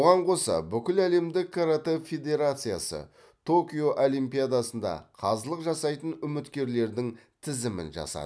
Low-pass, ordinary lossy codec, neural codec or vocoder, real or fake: none; none; none; real